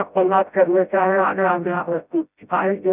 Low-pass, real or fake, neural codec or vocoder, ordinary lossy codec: 3.6 kHz; fake; codec, 16 kHz, 0.5 kbps, FreqCodec, smaller model; none